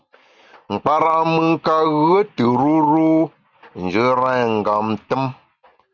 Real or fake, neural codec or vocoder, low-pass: real; none; 7.2 kHz